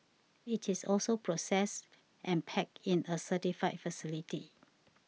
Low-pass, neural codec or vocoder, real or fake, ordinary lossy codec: none; none; real; none